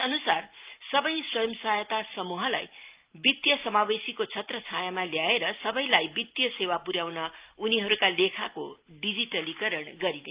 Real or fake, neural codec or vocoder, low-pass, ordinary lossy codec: real; none; 3.6 kHz; Opus, 32 kbps